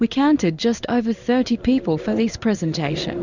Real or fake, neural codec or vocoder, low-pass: fake; codec, 16 kHz in and 24 kHz out, 1 kbps, XY-Tokenizer; 7.2 kHz